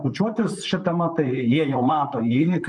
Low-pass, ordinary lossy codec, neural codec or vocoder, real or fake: 10.8 kHz; MP3, 96 kbps; vocoder, 44.1 kHz, 128 mel bands, Pupu-Vocoder; fake